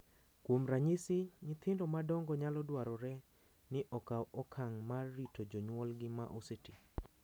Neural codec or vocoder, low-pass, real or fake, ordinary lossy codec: none; none; real; none